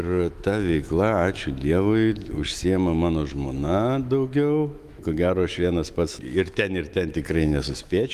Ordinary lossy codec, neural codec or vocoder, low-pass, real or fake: Opus, 32 kbps; autoencoder, 48 kHz, 128 numbers a frame, DAC-VAE, trained on Japanese speech; 14.4 kHz; fake